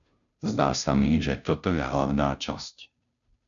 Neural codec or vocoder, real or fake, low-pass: codec, 16 kHz, 0.5 kbps, FunCodec, trained on Chinese and English, 25 frames a second; fake; 7.2 kHz